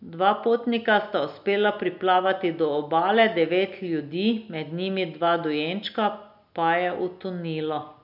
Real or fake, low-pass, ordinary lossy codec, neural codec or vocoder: real; 5.4 kHz; none; none